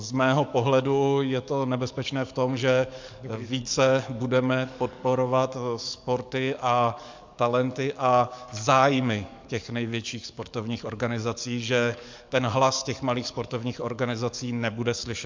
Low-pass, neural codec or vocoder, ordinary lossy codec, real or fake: 7.2 kHz; codec, 44.1 kHz, 7.8 kbps, DAC; MP3, 64 kbps; fake